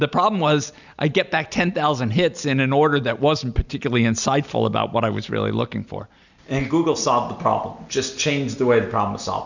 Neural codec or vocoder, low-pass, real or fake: none; 7.2 kHz; real